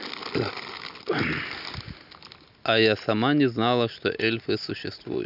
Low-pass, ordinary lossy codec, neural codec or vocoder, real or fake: 5.4 kHz; none; none; real